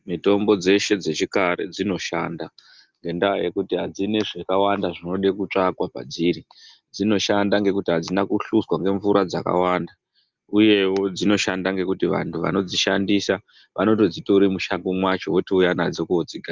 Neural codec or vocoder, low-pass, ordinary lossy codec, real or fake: none; 7.2 kHz; Opus, 32 kbps; real